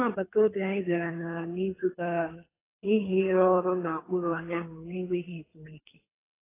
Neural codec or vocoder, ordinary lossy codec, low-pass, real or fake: codec, 24 kHz, 3 kbps, HILCodec; AAC, 16 kbps; 3.6 kHz; fake